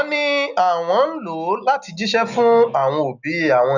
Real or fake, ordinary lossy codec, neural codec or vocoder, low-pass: real; none; none; 7.2 kHz